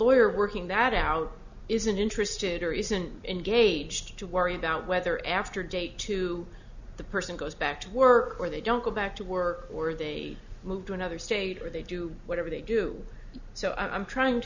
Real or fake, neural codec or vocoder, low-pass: real; none; 7.2 kHz